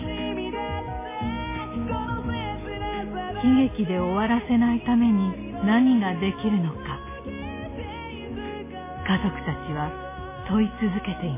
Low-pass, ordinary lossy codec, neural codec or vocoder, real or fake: 3.6 kHz; MP3, 16 kbps; none; real